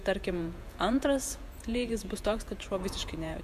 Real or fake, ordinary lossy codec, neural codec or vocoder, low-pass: fake; MP3, 96 kbps; vocoder, 48 kHz, 128 mel bands, Vocos; 14.4 kHz